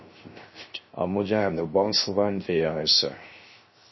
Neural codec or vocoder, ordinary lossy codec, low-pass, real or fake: codec, 16 kHz, 0.3 kbps, FocalCodec; MP3, 24 kbps; 7.2 kHz; fake